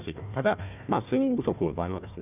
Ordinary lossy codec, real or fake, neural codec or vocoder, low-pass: none; fake; codec, 16 kHz, 1 kbps, FunCodec, trained on Chinese and English, 50 frames a second; 3.6 kHz